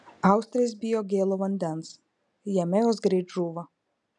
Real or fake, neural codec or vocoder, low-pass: real; none; 10.8 kHz